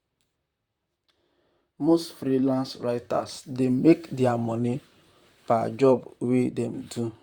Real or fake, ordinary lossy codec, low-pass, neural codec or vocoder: fake; Opus, 64 kbps; 19.8 kHz; vocoder, 44.1 kHz, 128 mel bands, Pupu-Vocoder